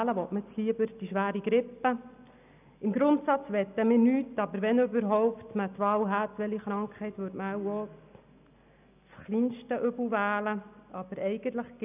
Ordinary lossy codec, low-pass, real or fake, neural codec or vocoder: none; 3.6 kHz; real; none